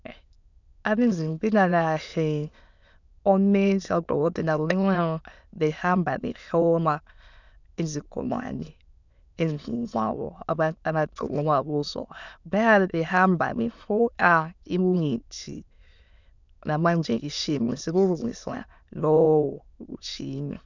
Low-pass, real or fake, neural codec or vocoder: 7.2 kHz; fake; autoencoder, 22.05 kHz, a latent of 192 numbers a frame, VITS, trained on many speakers